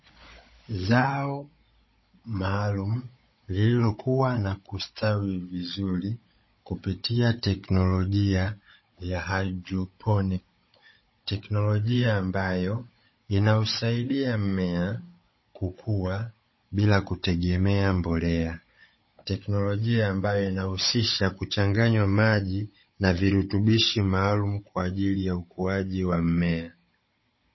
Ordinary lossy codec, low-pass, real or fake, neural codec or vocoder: MP3, 24 kbps; 7.2 kHz; fake; codec, 16 kHz, 16 kbps, FunCodec, trained on Chinese and English, 50 frames a second